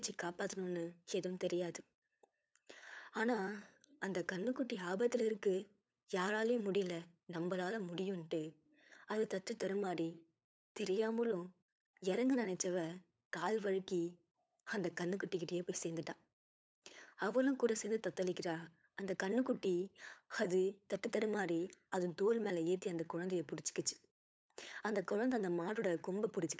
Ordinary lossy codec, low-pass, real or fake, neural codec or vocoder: none; none; fake; codec, 16 kHz, 8 kbps, FunCodec, trained on LibriTTS, 25 frames a second